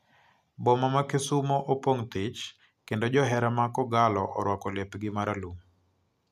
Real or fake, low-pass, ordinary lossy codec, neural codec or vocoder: real; 14.4 kHz; none; none